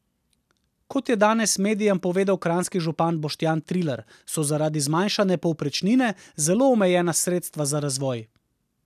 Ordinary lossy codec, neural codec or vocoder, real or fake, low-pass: none; none; real; 14.4 kHz